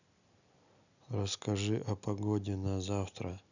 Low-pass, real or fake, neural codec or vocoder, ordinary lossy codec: 7.2 kHz; real; none; none